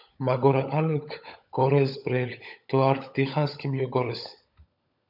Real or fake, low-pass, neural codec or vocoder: fake; 5.4 kHz; codec, 16 kHz, 16 kbps, FunCodec, trained on LibriTTS, 50 frames a second